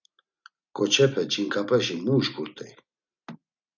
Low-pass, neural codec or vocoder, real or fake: 7.2 kHz; none; real